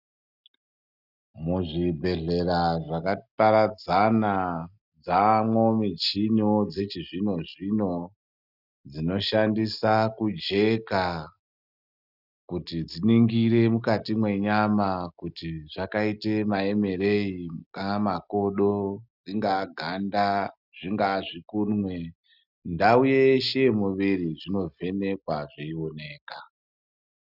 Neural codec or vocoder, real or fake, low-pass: none; real; 5.4 kHz